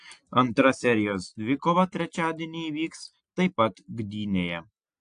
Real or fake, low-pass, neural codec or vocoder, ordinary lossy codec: real; 9.9 kHz; none; AAC, 64 kbps